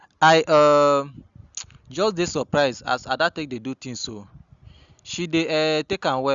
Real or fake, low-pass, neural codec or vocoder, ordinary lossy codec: real; 7.2 kHz; none; Opus, 64 kbps